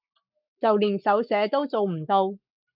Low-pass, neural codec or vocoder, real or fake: 5.4 kHz; codec, 44.1 kHz, 7.8 kbps, Pupu-Codec; fake